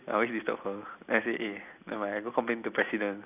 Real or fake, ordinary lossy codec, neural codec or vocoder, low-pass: real; none; none; 3.6 kHz